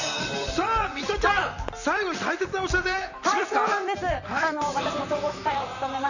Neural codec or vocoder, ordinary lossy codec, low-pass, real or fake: vocoder, 44.1 kHz, 128 mel bands, Pupu-Vocoder; none; 7.2 kHz; fake